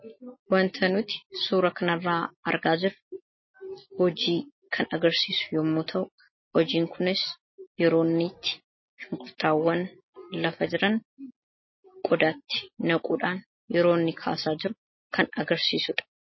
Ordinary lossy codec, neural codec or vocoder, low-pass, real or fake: MP3, 24 kbps; none; 7.2 kHz; real